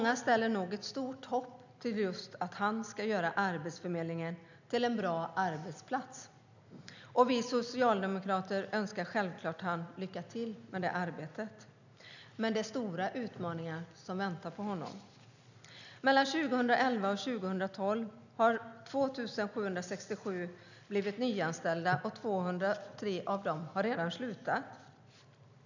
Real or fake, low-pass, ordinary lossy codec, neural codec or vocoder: real; 7.2 kHz; none; none